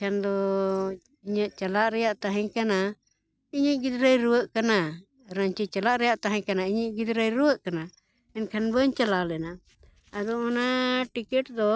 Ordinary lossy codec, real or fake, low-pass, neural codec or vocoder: none; real; none; none